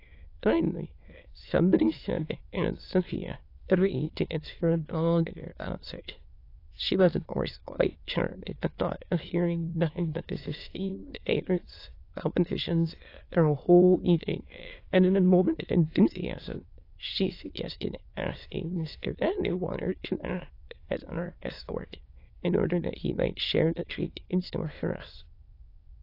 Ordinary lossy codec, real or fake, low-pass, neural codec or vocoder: AAC, 32 kbps; fake; 5.4 kHz; autoencoder, 22.05 kHz, a latent of 192 numbers a frame, VITS, trained on many speakers